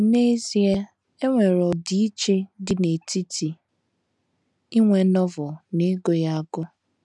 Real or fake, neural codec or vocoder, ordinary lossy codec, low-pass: real; none; none; 10.8 kHz